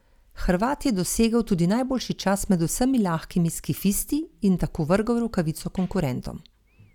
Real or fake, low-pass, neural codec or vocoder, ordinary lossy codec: real; 19.8 kHz; none; none